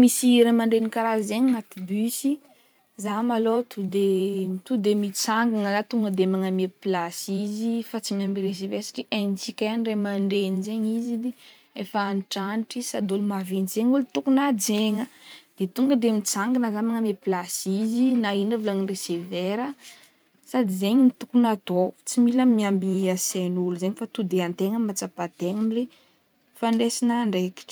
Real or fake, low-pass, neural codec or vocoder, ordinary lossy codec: fake; none; vocoder, 44.1 kHz, 128 mel bands every 512 samples, BigVGAN v2; none